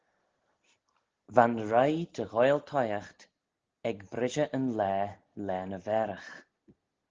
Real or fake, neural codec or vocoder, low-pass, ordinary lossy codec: real; none; 7.2 kHz; Opus, 16 kbps